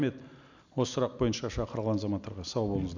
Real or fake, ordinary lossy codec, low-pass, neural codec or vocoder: real; none; 7.2 kHz; none